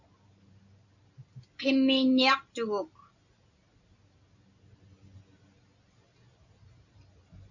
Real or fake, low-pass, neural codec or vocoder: real; 7.2 kHz; none